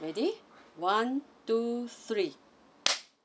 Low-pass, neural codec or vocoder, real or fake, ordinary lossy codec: none; none; real; none